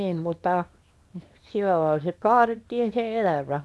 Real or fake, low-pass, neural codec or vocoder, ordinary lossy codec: fake; none; codec, 24 kHz, 0.9 kbps, WavTokenizer, small release; none